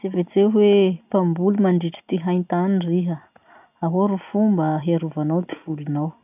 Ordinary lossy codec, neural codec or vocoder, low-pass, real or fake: none; none; 3.6 kHz; real